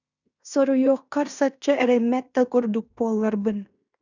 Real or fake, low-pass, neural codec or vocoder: fake; 7.2 kHz; codec, 16 kHz in and 24 kHz out, 0.9 kbps, LongCat-Audio-Codec, fine tuned four codebook decoder